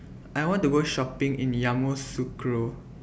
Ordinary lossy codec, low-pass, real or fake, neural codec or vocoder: none; none; real; none